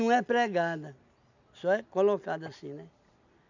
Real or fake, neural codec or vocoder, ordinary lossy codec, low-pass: real; none; none; 7.2 kHz